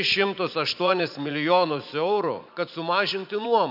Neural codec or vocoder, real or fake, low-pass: vocoder, 44.1 kHz, 80 mel bands, Vocos; fake; 5.4 kHz